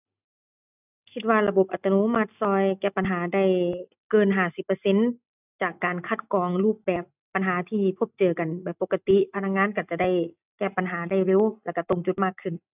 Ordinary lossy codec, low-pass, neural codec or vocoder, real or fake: none; 3.6 kHz; none; real